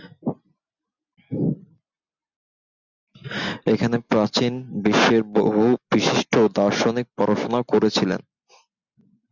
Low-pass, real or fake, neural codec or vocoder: 7.2 kHz; real; none